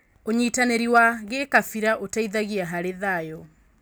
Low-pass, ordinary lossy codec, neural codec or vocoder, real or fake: none; none; none; real